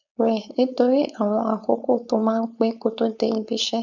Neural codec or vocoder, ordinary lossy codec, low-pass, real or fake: codec, 16 kHz, 4.8 kbps, FACodec; none; 7.2 kHz; fake